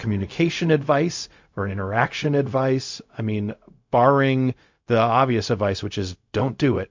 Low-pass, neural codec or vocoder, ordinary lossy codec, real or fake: 7.2 kHz; codec, 16 kHz, 0.4 kbps, LongCat-Audio-Codec; MP3, 48 kbps; fake